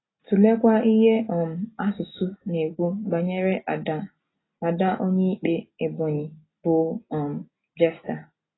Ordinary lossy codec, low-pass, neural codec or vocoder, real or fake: AAC, 16 kbps; 7.2 kHz; none; real